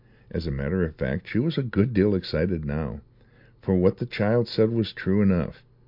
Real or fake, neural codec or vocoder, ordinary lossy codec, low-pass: real; none; MP3, 48 kbps; 5.4 kHz